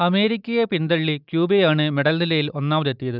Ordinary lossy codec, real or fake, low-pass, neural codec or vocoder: none; real; 5.4 kHz; none